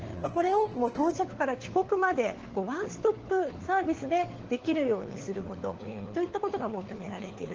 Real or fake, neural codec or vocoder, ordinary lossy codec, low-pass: fake; codec, 16 kHz, 4 kbps, FunCodec, trained on LibriTTS, 50 frames a second; Opus, 16 kbps; 7.2 kHz